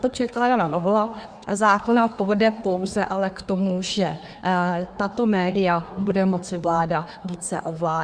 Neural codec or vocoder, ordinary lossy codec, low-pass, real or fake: codec, 24 kHz, 1 kbps, SNAC; Opus, 64 kbps; 9.9 kHz; fake